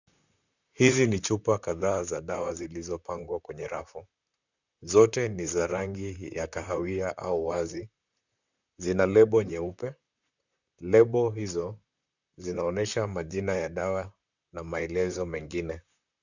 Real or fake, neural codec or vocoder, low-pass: fake; vocoder, 44.1 kHz, 128 mel bands, Pupu-Vocoder; 7.2 kHz